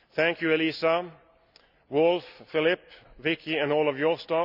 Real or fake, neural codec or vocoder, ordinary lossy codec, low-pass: real; none; none; 5.4 kHz